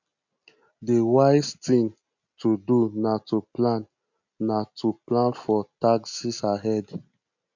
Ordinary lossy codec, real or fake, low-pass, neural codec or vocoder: none; real; 7.2 kHz; none